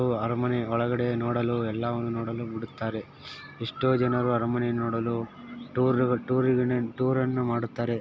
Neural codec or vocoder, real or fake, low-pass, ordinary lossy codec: none; real; none; none